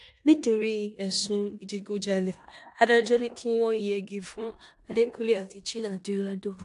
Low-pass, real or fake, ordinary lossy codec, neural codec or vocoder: 10.8 kHz; fake; AAC, 64 kbps; codec, 16 kHz in and 24 kHz out, 0.9 kbps, LongCat-Audio-Codec, four codebook decoder